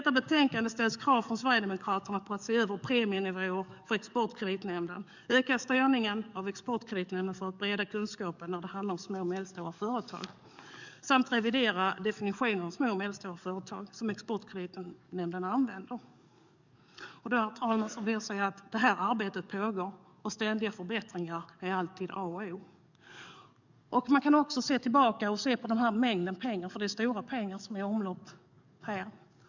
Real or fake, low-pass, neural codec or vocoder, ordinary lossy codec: fake; 7.2 kHz; codec, 44.1 kHz, 7.8 kbps, DAC; none